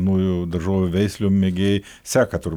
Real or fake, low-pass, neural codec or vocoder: real; 19.8 kHz; none